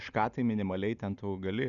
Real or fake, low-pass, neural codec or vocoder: real; 7.2 kHz; none